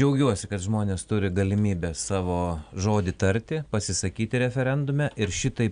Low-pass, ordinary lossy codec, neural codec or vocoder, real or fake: 9.9 kHz; MP3, 96 kbps; none; real